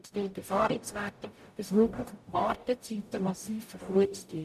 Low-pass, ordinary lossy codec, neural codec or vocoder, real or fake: 14.4 kHz; none; codec, 44.1 kHz, 0.9 kbps, DAC; fake